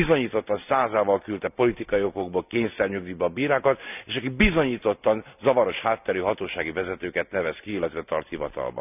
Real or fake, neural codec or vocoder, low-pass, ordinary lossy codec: real; none; 3.6 kHz; none